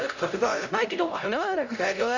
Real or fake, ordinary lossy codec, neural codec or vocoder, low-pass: fake; none; codec, 16 kHz, 0.5 kbps, X-Codec, HuBERT features, trained on LibriSpeech; 7.2 kHz